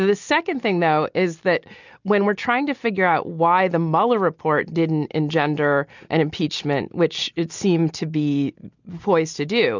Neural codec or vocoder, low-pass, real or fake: none; 7.2 kHz; real